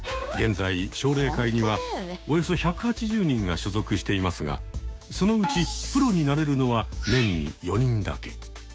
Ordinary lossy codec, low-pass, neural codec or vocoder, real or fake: none; none; codec, 16 kHz, 6 kbps, DAC; fake